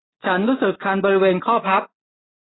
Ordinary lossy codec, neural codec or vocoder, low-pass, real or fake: AAC, 16 kbps; none; 7.2 kHz; real